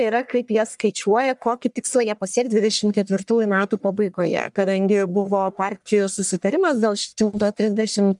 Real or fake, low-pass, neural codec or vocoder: fake; 10.8 kHz; codec, 44.1 kHz, 1.7 kbps, Pupu-Codec